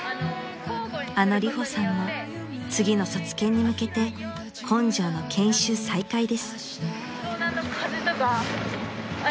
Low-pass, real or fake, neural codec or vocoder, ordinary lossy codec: none; real; none; none